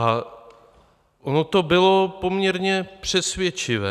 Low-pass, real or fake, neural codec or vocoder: 14.4 kHz; real; none